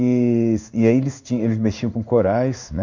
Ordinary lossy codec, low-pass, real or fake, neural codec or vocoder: none; 7.2 kHz; fake; codec, 16 kHz in and 24 kHz out, 1 kbps, XY-Tokenizer